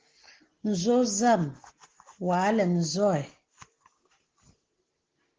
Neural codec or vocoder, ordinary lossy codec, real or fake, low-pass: none; Opus, 16 kbps; real; 7.2 kHz